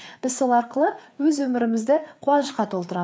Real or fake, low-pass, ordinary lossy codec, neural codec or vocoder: fake; none; none; codec, 16 kHz, 4 kbps, FreqCodec, larger model